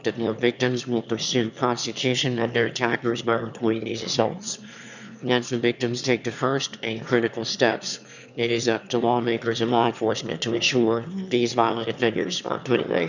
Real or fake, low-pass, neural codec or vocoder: fake; 7.2 kHz; autoencoder, 22.05 kHz, a latent of 192 numbers a frame, VITS, trained on one speaker